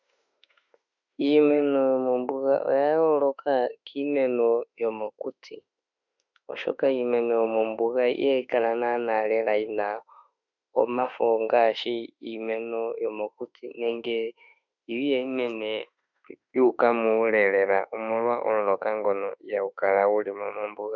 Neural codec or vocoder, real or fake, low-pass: autoencoder, 48 kHz, 32 numbers a frame, DAC-VAE, trained on Japanese speech; fake; 7.2 kHz